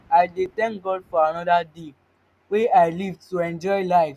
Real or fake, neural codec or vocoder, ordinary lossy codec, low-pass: real; none; none; 14.4 kHz